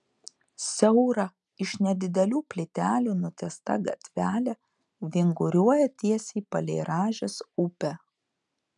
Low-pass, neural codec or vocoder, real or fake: 10.8 kHz; none; real